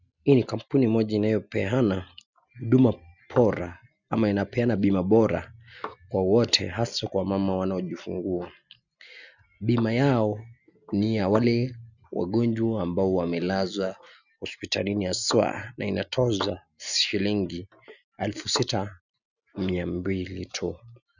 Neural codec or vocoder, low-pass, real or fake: none; 7.2 kHz; real